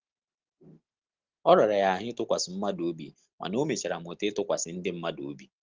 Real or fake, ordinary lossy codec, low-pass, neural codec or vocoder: real; Opus, 16 kbps; 7.2 kHz; none